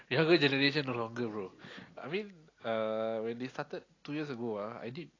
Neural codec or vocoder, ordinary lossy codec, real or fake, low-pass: none; AAC, 32 kbps; real; 7.2 kHz